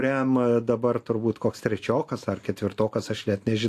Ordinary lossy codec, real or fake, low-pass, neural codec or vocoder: AAC, 64 kbps; real; 14.4 kHz; none